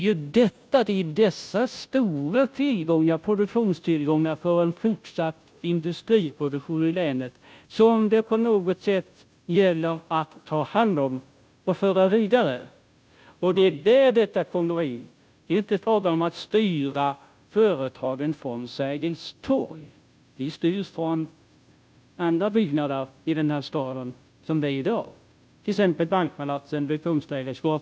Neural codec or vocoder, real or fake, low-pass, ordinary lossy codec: codec, 16 kHz, 0.5 kbps, FunCodec, trained on Chinese and English, 25 frames a second; fake; none; none